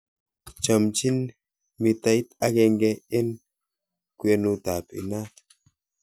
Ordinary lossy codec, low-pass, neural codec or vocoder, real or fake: none; none; none; real